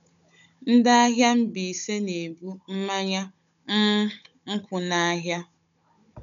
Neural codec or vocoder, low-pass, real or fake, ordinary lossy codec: codec, 16 kHz, 16 kbps, FunCodec, trained on Chinese and English, 50 frames a second; 7.2 kHz; fake; none